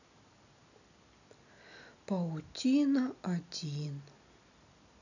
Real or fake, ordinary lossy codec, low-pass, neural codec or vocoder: real; none; 7.2 kHz; none